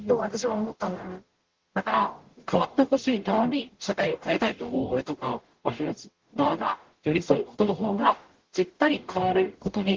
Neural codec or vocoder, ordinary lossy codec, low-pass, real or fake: codec, 44.1 kHz, 0.9 kbps, DAC; Opus, 16 kbps; 7.2 kHz; fake